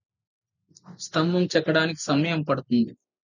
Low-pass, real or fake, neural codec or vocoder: 7.2 kHz; real; none